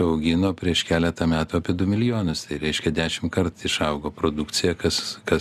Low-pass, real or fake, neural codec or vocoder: 14.4 kHz; real; none